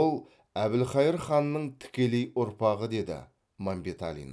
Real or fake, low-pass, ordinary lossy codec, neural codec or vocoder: real; none; none; none